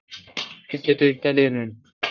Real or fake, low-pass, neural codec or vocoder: fake; 7.2 kHz; codec, 44.1 kHz, 1.7 kbps, Pupu-Codec